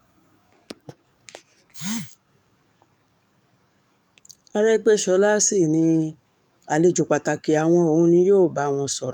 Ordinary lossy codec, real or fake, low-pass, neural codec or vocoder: none; fake; 19.8 kHz; codec, 44.1 kHz, 7.8 kbps, DAC